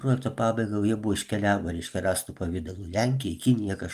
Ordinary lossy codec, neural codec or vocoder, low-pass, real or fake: Opus, 32 kbps; none; 14.4 kHz; real